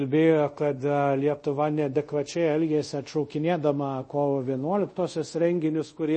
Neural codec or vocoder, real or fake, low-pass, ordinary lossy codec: codec, 24 kHz, 0.5 kbps, DualCodec; fake; 10.8 kHz; MP3, 32 kbps